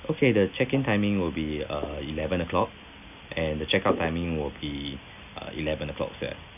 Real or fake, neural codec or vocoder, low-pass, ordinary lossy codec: real; none; 3.6 kHz; none